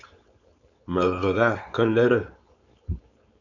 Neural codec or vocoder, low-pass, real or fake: codec, 16 kHz, 4.8 kbps, FACodec; 7.2 kHz; fake